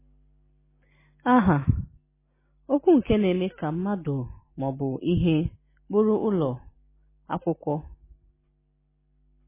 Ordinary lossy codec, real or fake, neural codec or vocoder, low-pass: MP3, 16 kbps; real; none; 3.6 kHz